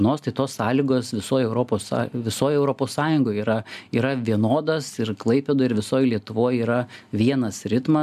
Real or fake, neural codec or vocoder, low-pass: real; none; 14.4 kHz